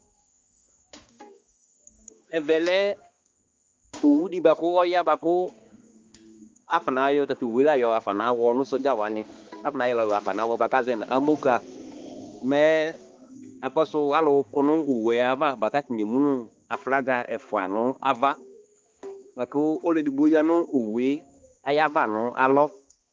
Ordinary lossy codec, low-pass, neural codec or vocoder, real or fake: Opus, 32 kbps; 7.2 kHz; codec, 16 kHz, 2 kbps, X-Codec, HuBERT features, trained on balanced general audio; fake